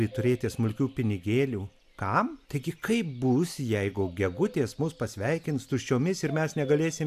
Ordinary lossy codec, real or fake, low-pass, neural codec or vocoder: AAC, 96 kbps; real; 14.4 kHz; none